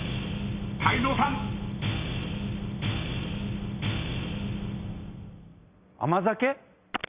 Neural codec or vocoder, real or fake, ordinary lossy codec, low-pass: none; real; Opus, 64 kbps; 3.6 kHz